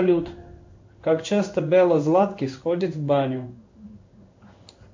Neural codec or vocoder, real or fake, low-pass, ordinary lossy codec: codec, 16 kHz in and 24 kHz out, 1 kbps, XY-Tokenizer; fake; 7.2 kHz; MP3, 48 kbps